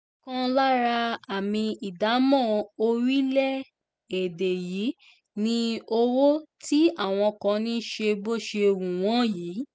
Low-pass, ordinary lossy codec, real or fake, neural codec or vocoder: none; none; real; none